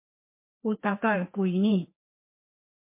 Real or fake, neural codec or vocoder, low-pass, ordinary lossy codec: fake; codec, 16 kHz, 2 kbps, FreqCodec, larger model; 3.6 kHz; MP3, 24 kbps